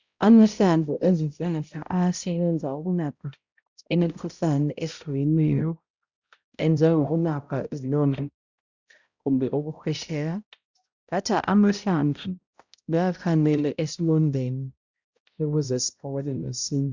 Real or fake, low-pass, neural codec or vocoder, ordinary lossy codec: fake; 7.2 kHz; codec, 16 kHz, 0.5 kbps, X-Codec, HuBERT features, trained on balanced general audio; Opus, 64 kbps